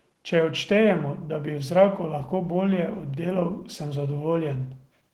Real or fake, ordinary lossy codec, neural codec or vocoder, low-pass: fake; Opus, 16 kbps; autoencoder, 48 kHz, 128 numbers a frame, DAC-VAE, trained on Japanese speech; 19.8 kHz